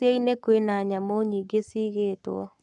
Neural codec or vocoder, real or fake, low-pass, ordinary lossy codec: codec, 44.1 kHz, 7.8 kbps, Pupu-Codec; fake; 10.8 kHz; none